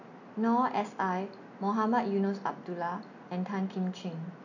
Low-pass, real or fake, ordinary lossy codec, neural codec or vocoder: 7.2 kHz; real; none; none